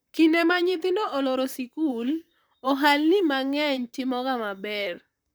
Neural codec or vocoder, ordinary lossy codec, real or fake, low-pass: vocoder, 44.1 kHz, 128 mel bands, Pupu-Vocoder; none; fake; none